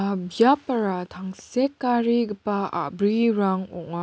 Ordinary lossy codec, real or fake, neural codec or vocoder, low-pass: none; real; none; none